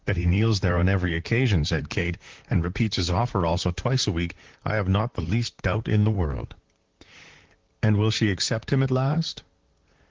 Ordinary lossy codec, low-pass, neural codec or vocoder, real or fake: Opus, 16 kbps; 7.2 kHz; vocoder, 44.1 kHz, 128 mel bands, Pupu-Vocoder; fake